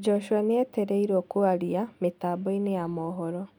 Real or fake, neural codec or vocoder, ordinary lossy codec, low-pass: real; none; none; 19.8 kHz